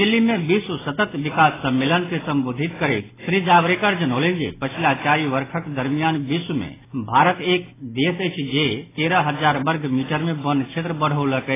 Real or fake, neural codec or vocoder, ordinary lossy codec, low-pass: real; none; AAC, 16 kbps; 3.6 kHz